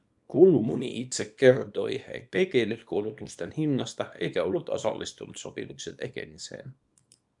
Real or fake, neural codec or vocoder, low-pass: fake; codec, 24 kHz, 0.9 kbps, WavTokenizer, small release; 10.8 kHz